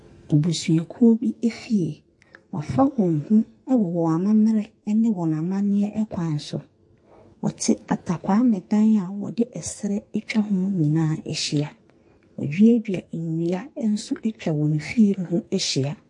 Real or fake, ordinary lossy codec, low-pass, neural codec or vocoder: fake; MP3, 48 kbps; 10.8 kHz; codec, 44.1 kHz, 2.6 kbps, SNAC